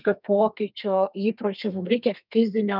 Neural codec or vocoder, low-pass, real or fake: codec, 32 kHz, 1.9 kbps, SNAC; 5.4 kHz; fake